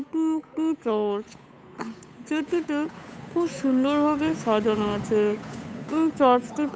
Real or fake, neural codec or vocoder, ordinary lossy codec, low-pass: fake; codec, 16 kHz, 8 kbps, FunCodec, trained on Chinese and English, 25 frames a second; none; none